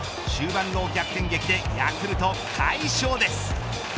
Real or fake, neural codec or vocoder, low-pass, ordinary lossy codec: real; none; none; none